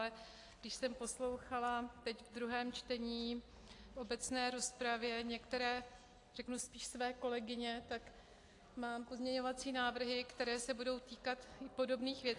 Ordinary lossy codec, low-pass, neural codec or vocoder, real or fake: AAC, 48 kbps; 10.8 kHz; none; real